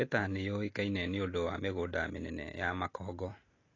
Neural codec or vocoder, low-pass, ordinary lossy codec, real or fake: none; 7.2 kHz; AAC, 32 kbps; real